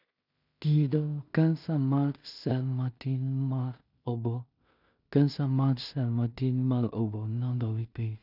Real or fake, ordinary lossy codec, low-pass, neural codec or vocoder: fake; none; 5.4 kHz; codec, 16 kHz in and 24 kHz out, 0.4 kbps, LongCat-Audio-Codec, two codebook decoder